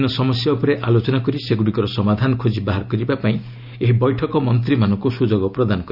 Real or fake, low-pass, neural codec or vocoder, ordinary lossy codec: real; 5.4 kHz; none; Opus, 64 kbps